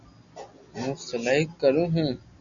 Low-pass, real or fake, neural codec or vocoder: 7.2 kHz; real; none